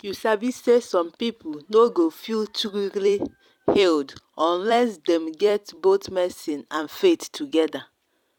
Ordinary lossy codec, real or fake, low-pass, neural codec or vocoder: none; fake; 19.8 kHz; vocoder, 44.1 kHz, 128 mel bands every 256 samples, BigVGAN v2